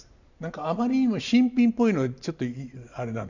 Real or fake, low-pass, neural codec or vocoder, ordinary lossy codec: real; 7.2 kHz; none; none